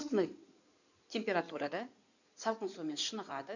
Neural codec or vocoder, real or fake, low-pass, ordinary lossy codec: codec, 16 kHz in and 24 kHz out, 2.2 kbps, FireRedTTS-2 codec; fake; 7.2 kHz; none